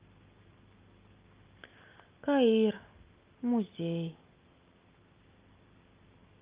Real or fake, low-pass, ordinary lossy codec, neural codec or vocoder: real; 3.6 kHz; Opus, 32 kbps; none